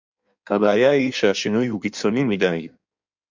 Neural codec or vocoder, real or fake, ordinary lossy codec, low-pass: codec, 16 kHz in and 24 kHz out, 1.1 kbps, FireRedTTS-2 codec; fake; MP3, 64 kbps; 7.2 kHz